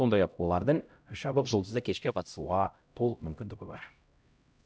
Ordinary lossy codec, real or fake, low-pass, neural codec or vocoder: none; fake; none; codec, 16 kHz, 0.5 kbps, X-Codec, HuBERT features, trained on LibriSpeech